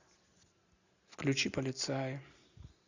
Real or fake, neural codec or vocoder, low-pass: real; none; 7.2 kHz